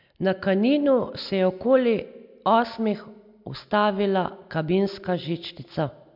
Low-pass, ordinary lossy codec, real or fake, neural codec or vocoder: 5.4 kHz; MP3, 48 kbps; real; none